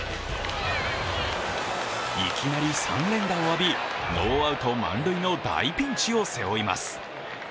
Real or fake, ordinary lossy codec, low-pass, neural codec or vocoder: real; none; none; none